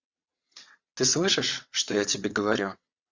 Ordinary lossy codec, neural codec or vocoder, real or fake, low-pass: Opus, 64 kbps; vocoder, 22.05 kHz, 80 mel bands, WaveNeXt; fake; 7.2 kHz